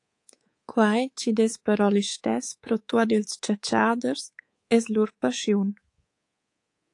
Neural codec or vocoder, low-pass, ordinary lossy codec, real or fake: codec, 24 kHz, 3.1 kbps, DualCodec; 10.8 kHz; AAC, 48 kbps; fake